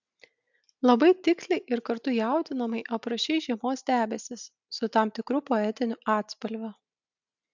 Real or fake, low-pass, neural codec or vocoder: real; 7.2 kHz; none